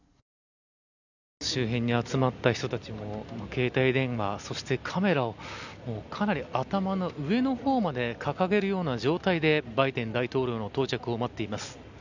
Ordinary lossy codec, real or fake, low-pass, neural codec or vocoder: none; real; 7.2 kHz; none